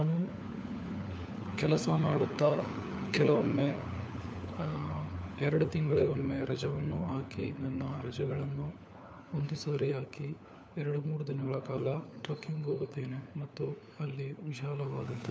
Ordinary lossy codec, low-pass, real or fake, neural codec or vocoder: none; none; fake; codec, 16 kHz, 4 kbps, FunCodec, trained on LibriTTS, 50 frames a second